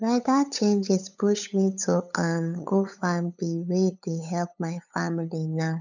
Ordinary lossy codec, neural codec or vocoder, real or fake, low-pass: none; codec, 16 kHz, 8 kbps, FunCodec, trained on LibriTTS, 25 frames a second; fake; 7.2 kHz